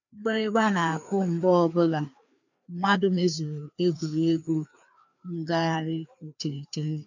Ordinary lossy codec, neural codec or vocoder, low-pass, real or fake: none; codec, 16 kHz, 2 kbps, FreqCodec, larger model; 7.2 kHz; fake